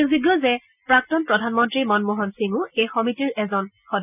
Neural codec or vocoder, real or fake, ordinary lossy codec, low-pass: none; real; none; 3.6 kHz